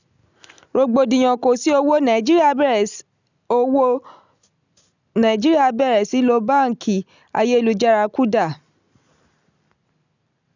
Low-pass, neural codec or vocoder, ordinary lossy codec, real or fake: 7.2 kHz; none; none; real